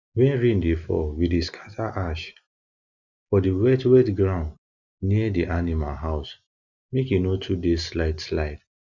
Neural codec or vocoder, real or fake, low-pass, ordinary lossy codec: none; real; 7.2 kHz; none